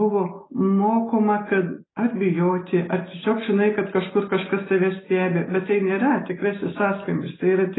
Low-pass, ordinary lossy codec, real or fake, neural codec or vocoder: 7.2 kHz; AAC, 16 kbps; real; none